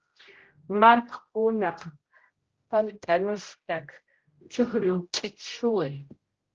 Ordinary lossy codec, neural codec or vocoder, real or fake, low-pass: Opus, 16 kbps; codec, 16 kHz, 0.5 kbps, X-Codec, HuBERT features, trained on general audio; fake; 7.2 kHz